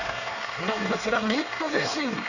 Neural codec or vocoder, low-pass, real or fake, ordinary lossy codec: codec, 24 kHz, 1 kbps, SNAC; 7.2 kHz; fake; none